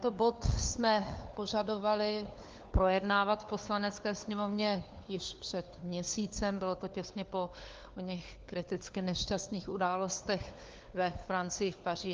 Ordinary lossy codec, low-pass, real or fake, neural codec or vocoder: Opus, 32 kbps; 7.2 kHz; fake; codec, 16 kHz, 4 kbps, FunCodec, trained on LibriTTS, 50 frames a second